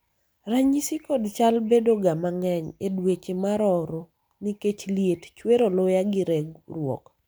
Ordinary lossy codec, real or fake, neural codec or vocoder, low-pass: none; real; none; none